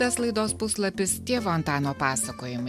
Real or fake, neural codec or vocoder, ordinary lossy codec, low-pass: real; none; AAC, 96 kbps; 14.4 kHz